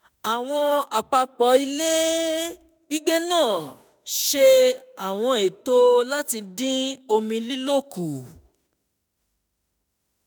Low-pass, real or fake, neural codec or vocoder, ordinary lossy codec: none; fake; autoencoder, 48 kHz, 32 numbers a frame, DAC-VAE, trained on Japanese speech; none